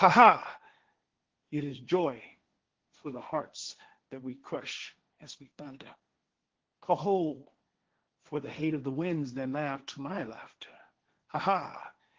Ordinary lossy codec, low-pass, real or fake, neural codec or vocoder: Opus, 16 kbps; 7.2 kHz; fake; codec, 16 kHz, 1.1 kbps, Voila-Tokenizer